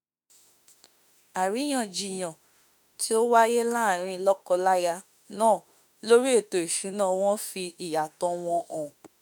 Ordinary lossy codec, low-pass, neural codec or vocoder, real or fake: none; none; autoencoder, 48 kHz, 32 numbers a frame, DAC-VAE, trained on Japanese speech; fake